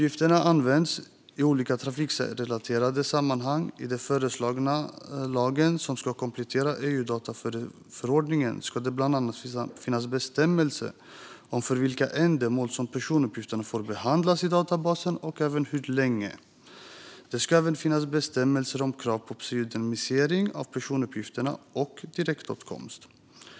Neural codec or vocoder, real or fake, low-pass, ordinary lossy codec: none; real; none; none